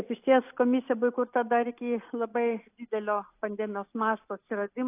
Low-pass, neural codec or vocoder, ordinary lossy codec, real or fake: 3.6 kHz; none; AAC, 24 kbps; real